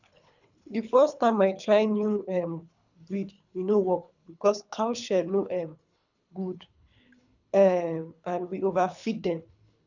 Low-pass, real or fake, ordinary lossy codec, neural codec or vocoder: 7.2 kHz; fake; none; codec, 24 kHz, 3 kbps, HILCodec